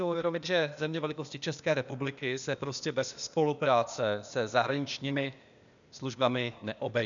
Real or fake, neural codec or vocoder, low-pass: fake; codec, 16 kHz, 0.8 kbps, ZipCodec; 7.2 kHz